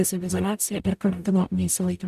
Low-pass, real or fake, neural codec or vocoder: 14.4 kHz; fake; codec, 44.1 kHz, 0.9 kbps, DAC